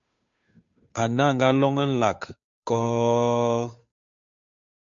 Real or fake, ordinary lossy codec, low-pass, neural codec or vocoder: fake; MP3, 64 kbps; 7.2 kHz; codec, 16 kHz, 2 kbps, FunCodec, trained on Chinese and English, 25 frames a second